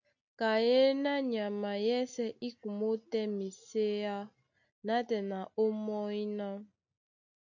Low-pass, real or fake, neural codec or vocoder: 7.2 kHz; real; none